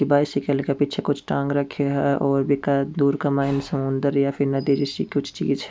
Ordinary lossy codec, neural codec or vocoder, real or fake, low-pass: none; none; real; none